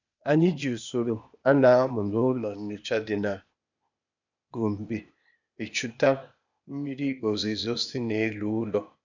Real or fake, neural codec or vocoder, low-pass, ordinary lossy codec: fake; codec, 16 kHz, 0.8 kbps, ZipCodec; 7.2 kHz; none